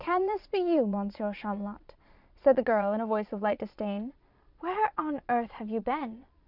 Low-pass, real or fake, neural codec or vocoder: 5.4 kHz; fake; vocoder, 22.05 kHz, 80 mel bands, Vocos